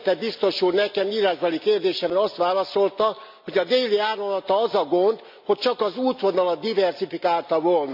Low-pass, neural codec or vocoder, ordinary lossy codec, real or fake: 5.4 kHz; none; MP3, 48 kbps; real